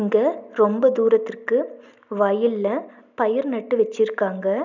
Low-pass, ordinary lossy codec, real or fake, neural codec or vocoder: 7.2 kHz; none; real; none